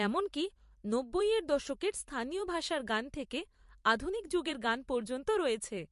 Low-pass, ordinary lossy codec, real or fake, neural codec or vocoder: 14.4 kHz; MP3, 48 kbps; fake; vocoder, 44.1 kHz, 128 mel bands every 512 samples, BigVGAN v2